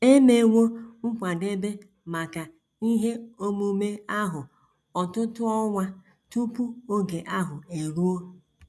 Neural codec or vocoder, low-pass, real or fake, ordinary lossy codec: none; none; real; none